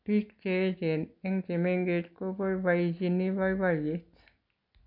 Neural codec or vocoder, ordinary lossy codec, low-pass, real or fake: none; none; 5.4 kHz; real